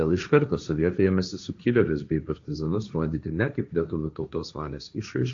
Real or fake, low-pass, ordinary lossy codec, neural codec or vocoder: fake; 7.2 kHz; AAC, 64 kbps; codec, 16 kHz, 1.1 kbps, Voila-Tokenizer